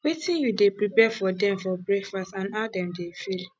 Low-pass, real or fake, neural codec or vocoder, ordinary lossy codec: none; real; none; none